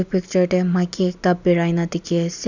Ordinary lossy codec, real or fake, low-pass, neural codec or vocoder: none; real; 7.2 kHz; none